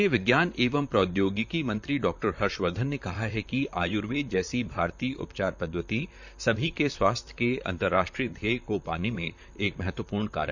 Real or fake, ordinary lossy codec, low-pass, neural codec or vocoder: fake; none; 7.2 kHz; vocoder, 44.1 kHz, 128 mel bands, Pupu-Vocoder